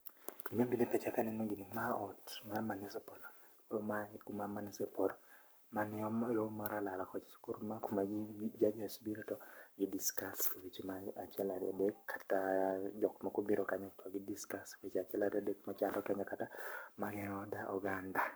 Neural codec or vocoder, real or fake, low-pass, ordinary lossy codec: codec, 44.1 kHz, 7.8 kbps, Pupu-Codec; fake; none; none